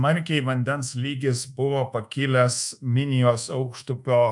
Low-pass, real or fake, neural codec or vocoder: 10.8 kHz; fake; codec, 24 kHz, 1.2 kbps, DualCodec